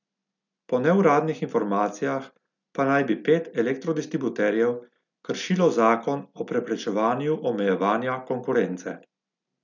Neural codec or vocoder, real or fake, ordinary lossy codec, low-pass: none; real; none; 7.2 kHz